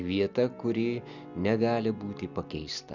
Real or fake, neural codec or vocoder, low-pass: real; none; 7.2 kHz